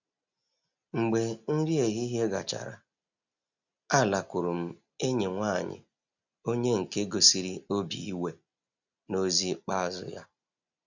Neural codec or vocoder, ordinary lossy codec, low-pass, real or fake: none; none; 7.2 kHz; real